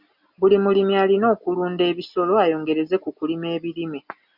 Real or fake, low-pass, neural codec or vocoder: real; 5.4 kHz; none